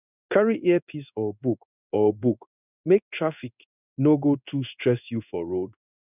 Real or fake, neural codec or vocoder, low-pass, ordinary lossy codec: fake; codec, 16 kHz in and 24 kHz out, 1 kbps, XY-Tokenizer; 3.6 kHz; none